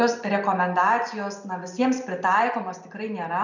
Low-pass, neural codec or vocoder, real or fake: 7.2 kHz; none; real